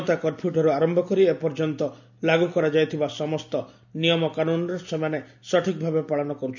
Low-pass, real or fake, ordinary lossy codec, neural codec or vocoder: 7.2 kHz; real; none; none